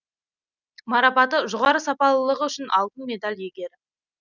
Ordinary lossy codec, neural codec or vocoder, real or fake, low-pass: none; none; real; 7.2 kHz